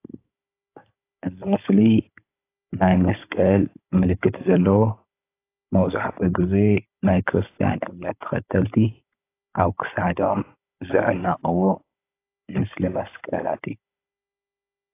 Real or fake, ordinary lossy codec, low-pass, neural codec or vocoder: fake; AAC, 24 kbps; 3.6 kHz; codec, 16 kHz, 16 kbps, FunCodec, trained on Chinese and English, 50 frames a second